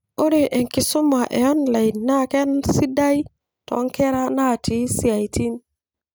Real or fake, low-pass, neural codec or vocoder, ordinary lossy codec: real; none; none; none